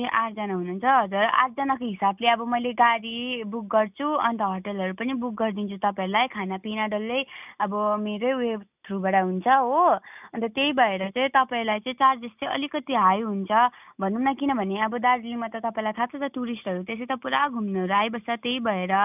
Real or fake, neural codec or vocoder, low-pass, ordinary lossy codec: real; none; 3.6 kHz; none